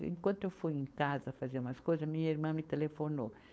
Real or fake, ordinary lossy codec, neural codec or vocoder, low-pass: fake; none; codec, 16 kHz, 4.8 kbps, FACodec; none